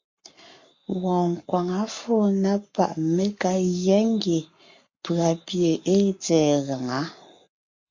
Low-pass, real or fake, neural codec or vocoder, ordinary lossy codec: 7.2 kHz; fake; codec, 44.1 kHz, 7.8 kbps, Pupu-Codec; MP3, 48 kbps